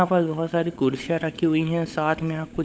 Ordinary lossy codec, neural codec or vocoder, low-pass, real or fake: none; codec, 16 kHz, 16 kbps, FunCodec, trained on LibriTTS, 50 frames a second; none; fake